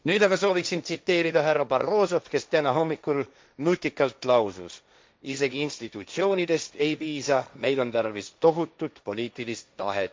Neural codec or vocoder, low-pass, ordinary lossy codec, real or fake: codec, 16 kHz, 1.1 kbps, Voila-Tokenizer; none; none; fake